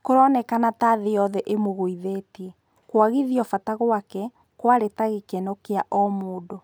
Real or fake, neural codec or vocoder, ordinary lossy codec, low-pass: real; none; none; none